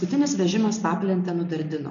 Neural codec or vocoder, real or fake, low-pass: none; real; 7.2 kHz